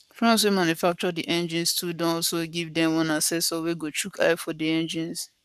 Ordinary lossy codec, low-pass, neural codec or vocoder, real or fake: none; 14.4 kHz; codec, 44.1 kHz, 7.8 kbps, Pupu-Codec; fake